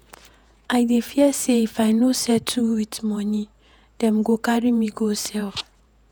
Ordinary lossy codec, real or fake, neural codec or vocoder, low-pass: none; fake; vocoder, 48 kHz, 128 mel bands, Vocos; none